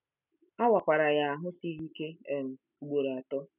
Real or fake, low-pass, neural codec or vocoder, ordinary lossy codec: real; 3.6 kHz; none; none